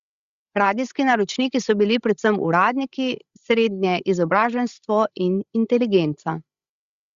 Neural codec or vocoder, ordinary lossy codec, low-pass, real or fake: codec, 16 kHz, 16 kbps, FreqCodec, larger model; Opus, 64 kbps; 7.2 kHz; fake